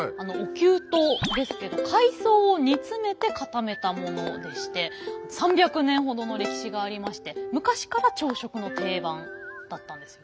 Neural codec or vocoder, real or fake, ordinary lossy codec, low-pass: none; real; none; none